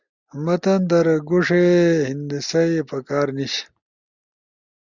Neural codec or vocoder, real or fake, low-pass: none; real; 7.2 kHz